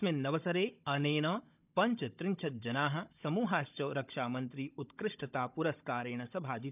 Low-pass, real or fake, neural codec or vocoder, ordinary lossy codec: 3.6 kHz; fake; codec, 16 kHz, 16 kbps, FunCodec, trained on Chinese and English, 50 frames a second; none